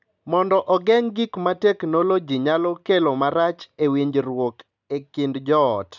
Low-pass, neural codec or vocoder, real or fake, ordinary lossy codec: 7.2 kHz; none; real; none